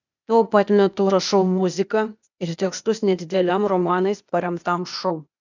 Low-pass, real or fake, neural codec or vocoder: 7.2 kHz; fake; codec, 16 kHz, 0.8 kbps, ZipCodec